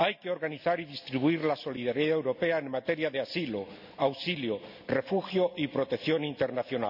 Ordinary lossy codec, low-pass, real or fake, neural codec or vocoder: none; 5.4 kHz; real; none